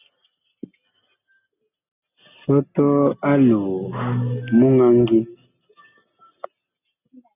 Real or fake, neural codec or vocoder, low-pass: real; none; 3.6 kHz